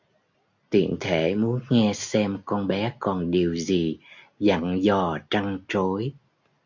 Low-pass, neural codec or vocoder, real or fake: 7.2 kHz; none; real